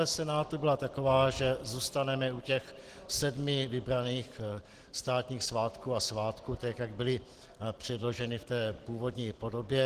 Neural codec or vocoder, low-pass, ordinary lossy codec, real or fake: none; 14.4 kHz; Opus, 16 kbps; real